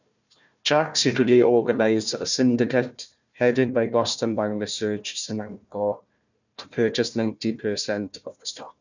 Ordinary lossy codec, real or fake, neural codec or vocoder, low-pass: none; fake; codec, 16 kHz, 1 kbps, FunCodec, trained on Chinese and English, 50 frames a second; 7.2 kHz